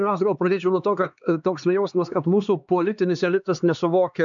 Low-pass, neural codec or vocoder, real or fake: 7.2 kHz; codec, 16 kHz, 2 kbps, X-Codec, HuBERT features, trained on LibriSpeech; fake